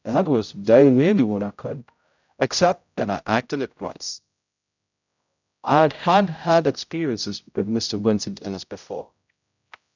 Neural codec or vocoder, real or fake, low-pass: codec, 16 kHz, 0.5 kbps, X-Codec, HuBERT features, trained on general audio; fake; 7.2 kHz